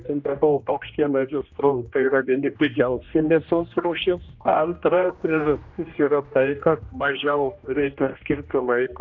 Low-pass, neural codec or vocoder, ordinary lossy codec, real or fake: 7.2 kHz; codec, 16 kHz, 1 kbps, X-Codec, HuBERT features, trained on general audio; AAC, 48 kbps; fake